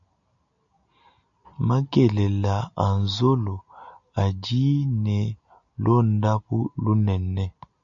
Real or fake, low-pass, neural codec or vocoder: real; 7.2 kHz; none